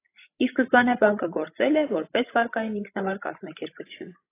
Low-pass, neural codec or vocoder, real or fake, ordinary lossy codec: 3.6 kHz; codec, 16 kHz, 16 kbps, FreqCodec, larger model; fake; AAC, 24 kbps